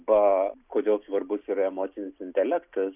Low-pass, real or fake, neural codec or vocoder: 3.6 kHz; real; none